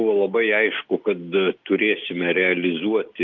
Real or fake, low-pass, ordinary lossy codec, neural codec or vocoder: real; 7.2 kHz; Opus, 32 kbps; none